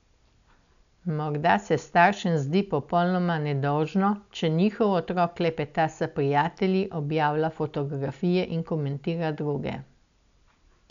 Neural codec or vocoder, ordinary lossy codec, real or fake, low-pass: none; none; real; 7.2 kHz